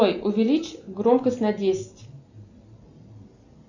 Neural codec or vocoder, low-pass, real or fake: none; 7.2 kHz; real